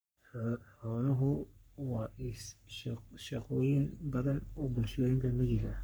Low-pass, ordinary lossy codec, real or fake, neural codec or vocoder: none; none; fake; codec, 44.1 kHz, 3.4 kbps, Pupu-Codec